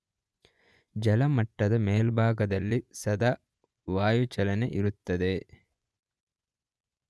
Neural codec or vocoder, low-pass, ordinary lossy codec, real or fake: vocoder, 24 kHz, 100 mel bands, Vocos; none; none; fake